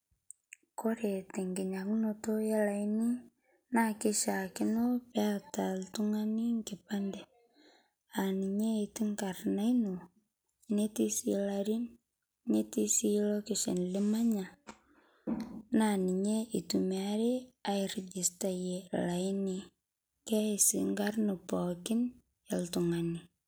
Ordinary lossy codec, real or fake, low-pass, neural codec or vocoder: none; real; none; none